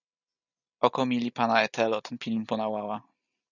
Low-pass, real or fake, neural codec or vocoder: 7.2 kHz; real; none